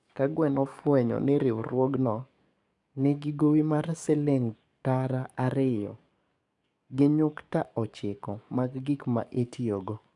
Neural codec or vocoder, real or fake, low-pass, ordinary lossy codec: codec, 44.1 kHz, 7.8 kbps, DAC; fake; 10.8 kHz; none